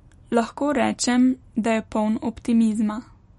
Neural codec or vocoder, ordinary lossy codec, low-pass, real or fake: none; MP3, 48 kbps; 19.8 kHz; real